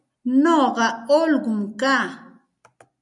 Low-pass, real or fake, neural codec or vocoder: 10.8 kHz; real; none